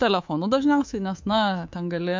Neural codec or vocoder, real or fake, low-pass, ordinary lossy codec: codec, 24 kHz, 3.1 kbps, DualCodec; fake; 7.2 kHz; MP3, 48 kbps